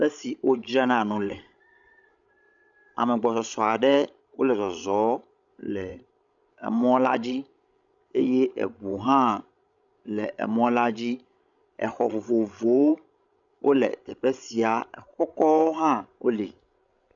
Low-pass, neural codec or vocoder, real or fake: 7.2 kHz; codec, 16 kHz, 16 kbps, FreqCodec, larger model; fake